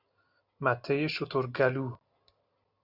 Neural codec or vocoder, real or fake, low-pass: none; real; 5.4 kHz